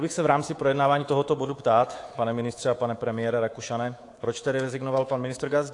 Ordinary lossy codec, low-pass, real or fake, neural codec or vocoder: AAC, 48 kbps; 10.8 kHz; fake; codec, 24 kHz, 3.1 kbps, DualCodec